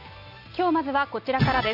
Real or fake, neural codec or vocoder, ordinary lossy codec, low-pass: real; none; none; 5.4 kHz